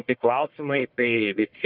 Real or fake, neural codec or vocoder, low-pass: fake; codec, 44.1 kHz, 1.7 kbps, Pupu-Codec; 5.4 kHz